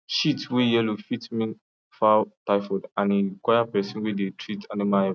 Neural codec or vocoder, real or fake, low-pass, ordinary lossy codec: none; real; none; none